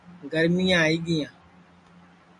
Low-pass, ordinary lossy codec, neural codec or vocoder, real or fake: 10.8 kHz; MP3, 64 kbps; none; real